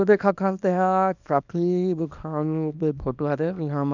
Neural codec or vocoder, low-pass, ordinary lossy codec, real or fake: codec, 24 kHz, 0.9 kbps, WavTokenizer, small release; 7.2 kHz; none; fake